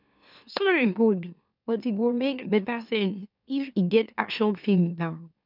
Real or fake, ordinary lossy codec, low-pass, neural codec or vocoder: fake; none; 5.4 kHz; autoencoder, 44.1 kHz, a latent of 192 numbers a frame, MeloTTS